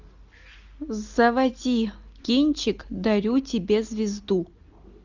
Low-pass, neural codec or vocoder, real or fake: 7.2 kHz; none; real